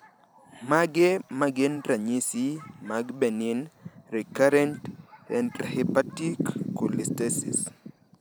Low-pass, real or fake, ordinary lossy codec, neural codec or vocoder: none; fake; none; vocoder, 44.1 kHz, 128 mel bands every 512 samples, BigVGAN v2